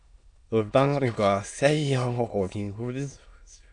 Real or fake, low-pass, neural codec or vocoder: fake; 9.9 kHz; autoencoder, 22.05 kHz, a latent of 192 numbers a frame, VITS, trained on many speakers